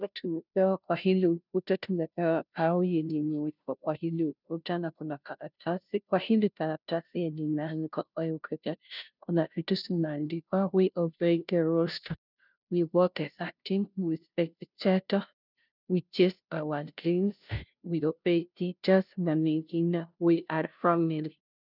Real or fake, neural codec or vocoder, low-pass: fake; codec, 16 kHz, 0.5 kbps, FunCodec, trained on Chinese and English, 25 frames a second; 5.4 kHz